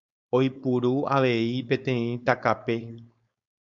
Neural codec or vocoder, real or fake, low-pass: codec, 16 kHz, 4.8 kbps, FACodec; fake; 7.2 kHz